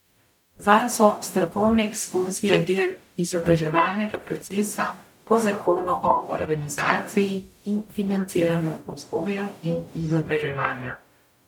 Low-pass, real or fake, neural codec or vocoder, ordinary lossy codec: 19.8 kHz; fake; codec, 44.1 kHz, 0.9 kbps, DAC; none